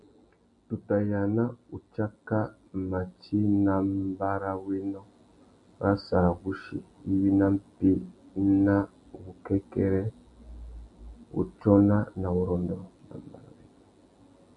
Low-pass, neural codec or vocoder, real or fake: 10.8 kHz; none; real